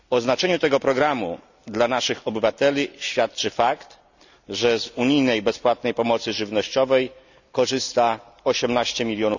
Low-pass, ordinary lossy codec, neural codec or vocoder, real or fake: 7.2 kHz; none; none; real